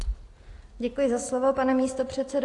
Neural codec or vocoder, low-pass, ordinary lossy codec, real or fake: none; 10.8 kHz; AAC, 48 kbps; real